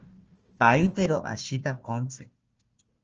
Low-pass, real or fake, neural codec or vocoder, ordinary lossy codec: 7.2 kHz; fake; codec, 16 kHz, 1 kbps, FunCodec, trained on Chinese and English, 50 frames a second; Opus, 24 kbps